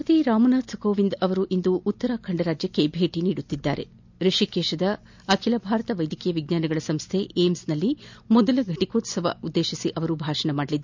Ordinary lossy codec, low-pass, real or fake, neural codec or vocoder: MP3, 64 kbps; 7.2 kHz; real; none